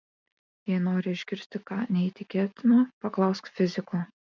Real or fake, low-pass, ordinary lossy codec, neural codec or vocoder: real; 7.2 kHz; MP3, 64 kbps; none